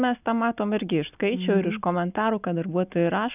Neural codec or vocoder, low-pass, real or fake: none; 3.6 kHz; real